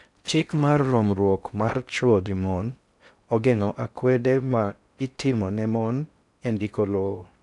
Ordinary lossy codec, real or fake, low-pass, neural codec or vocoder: none; fake; 10.8 kHz; codec, 16 kHz in and 24 kHz out, 0.6 kbps, FocalCodec, streaming, 4096 codes